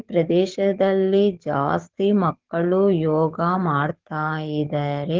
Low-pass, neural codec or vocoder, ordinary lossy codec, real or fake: 7.2 kHz; codec, 44.1 kHz, 7.8 kbps, DAC; Opus, 16 kbps; fake